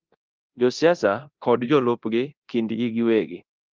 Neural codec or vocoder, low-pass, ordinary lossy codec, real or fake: codec, 24 kHz, 0.9 kbps, DualCodec; 7.2 kHz; Opus, 32 kbps; fake